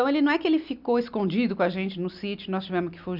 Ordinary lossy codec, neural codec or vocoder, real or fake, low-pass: none; none; real; 5.4 kHz